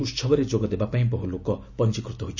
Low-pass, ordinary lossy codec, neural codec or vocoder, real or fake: 7.2 kHz; none; none; real